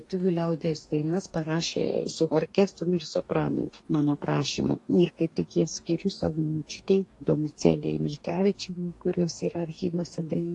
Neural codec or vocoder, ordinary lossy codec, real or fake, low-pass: codec, 44.1 kHz, 2.6 kbps, DAC; AAC, 48 kbps; fake; 10.8 kHz